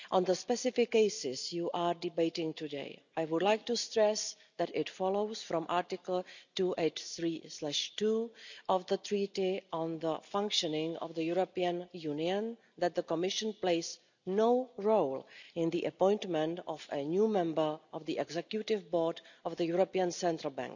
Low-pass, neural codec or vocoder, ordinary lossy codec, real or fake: 7.2 kHz; none; none; real